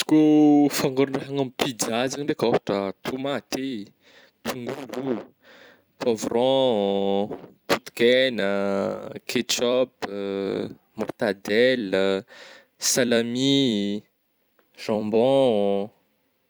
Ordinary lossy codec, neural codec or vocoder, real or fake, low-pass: none; vocoder, 44.1 kHz, 128 mel bands every 256 samples, BigVGAN v2; fake; none